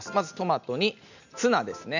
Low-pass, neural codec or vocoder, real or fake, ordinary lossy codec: 7.2 kHz; none; real; none